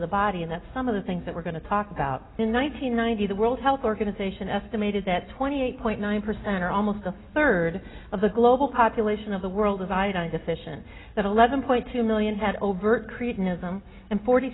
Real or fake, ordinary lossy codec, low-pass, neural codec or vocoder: real; AAC, 16 kbps; 7.2 kHz; none